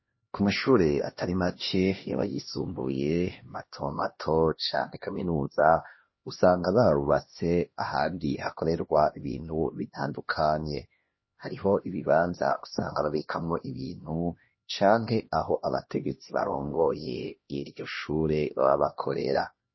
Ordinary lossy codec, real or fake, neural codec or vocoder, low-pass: MP3, 24 kbps; fake; codec, 16 kHz, 1 kbps, X-Codec, HuBERT features, trained on LibriSpeech; 7.2 kHz